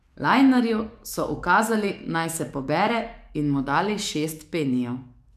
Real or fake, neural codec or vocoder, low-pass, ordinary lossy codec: fake; codec, 44.1 kHz, 7.8 kbps, DAC; 14.4 kHz; none